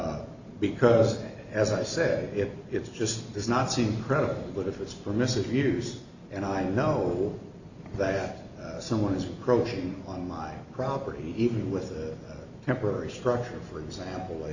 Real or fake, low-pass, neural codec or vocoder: real; 7.2 kHz; none